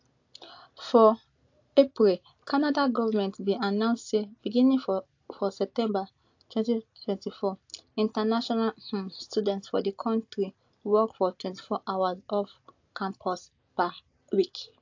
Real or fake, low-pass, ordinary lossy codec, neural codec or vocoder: real; 7.2 kHz; AAC, 48 kbps; none